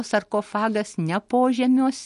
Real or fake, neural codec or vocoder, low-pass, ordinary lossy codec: real; none; 14.4 kHz; MP3, 48 kbps